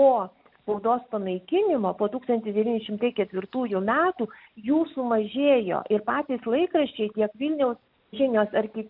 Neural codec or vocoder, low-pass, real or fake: none; 5.4 kHz; real